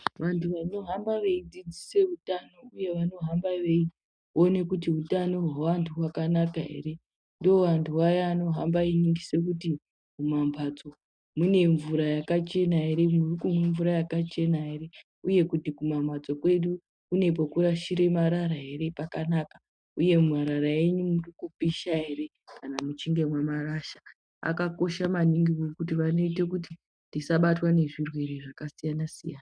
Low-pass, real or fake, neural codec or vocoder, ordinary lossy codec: 9.9 kHz; real; none; MP3, 96 kbps